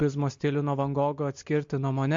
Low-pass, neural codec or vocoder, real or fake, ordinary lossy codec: 7.2 kHz; none; real; MP3, 48 kbps